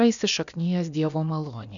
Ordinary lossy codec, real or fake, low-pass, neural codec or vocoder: MP3, 96 kbps; fake; 7.2 kHz; codec, 16 kHz, about 1 kbps, DyCAST, with the encoder's durations